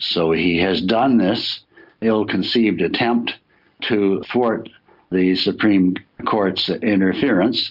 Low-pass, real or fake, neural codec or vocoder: 5.4 kHz; real; none